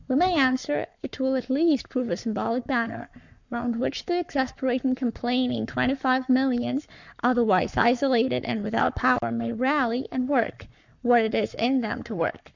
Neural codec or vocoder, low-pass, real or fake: codec, 44.1 kHz, 7.8 kbps, Pupu-Codec; 7.2 kHz; fake